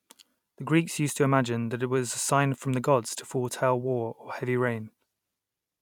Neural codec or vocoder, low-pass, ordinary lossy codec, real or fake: vocoder, 44.1 kHz, 128 mel bands every 256 samples, BigVGAN v2; 19.8 kHz; none; fake